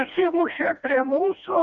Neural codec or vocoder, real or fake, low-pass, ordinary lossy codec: codec, 16 kHz, 1 kbps, FreqCodec, smaller model; fake; 7.2 kHz; Opus, 64 kbps